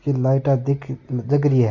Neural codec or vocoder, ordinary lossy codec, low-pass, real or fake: none; Opus, 64 kbps; 7.2 kHz; real